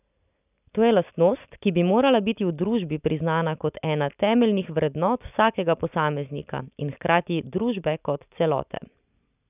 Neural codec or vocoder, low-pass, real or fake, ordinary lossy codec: none; 3.6 kHz; real; none